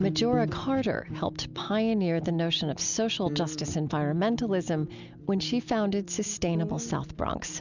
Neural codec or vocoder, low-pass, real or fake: none; 7.2 kHz; real